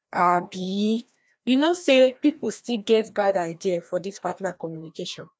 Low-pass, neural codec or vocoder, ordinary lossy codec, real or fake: none; codec, 16 kHz, 1 kbps, FreqCodec, larger model; none; fake